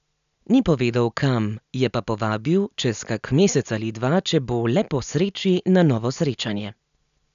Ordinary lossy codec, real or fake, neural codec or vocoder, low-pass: none; real; none; 7.2 kHz